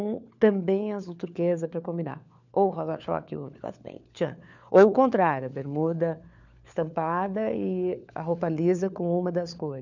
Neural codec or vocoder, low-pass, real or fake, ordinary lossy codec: codec, 16 kHz, 4 kbps, FunCodec, trained on LibriTTS, 50 frames a second; 7.2 kHz; fake; none